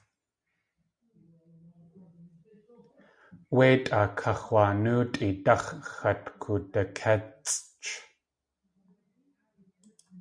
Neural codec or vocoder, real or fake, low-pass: none; real; 9.9 kHz